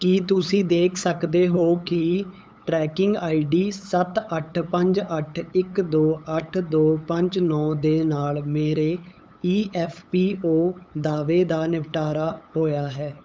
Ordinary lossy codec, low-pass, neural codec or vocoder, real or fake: none; none; codec, 16 kHz, 8 kbps, FunCodec, trained on LibriTTS, 25 frames a second; fake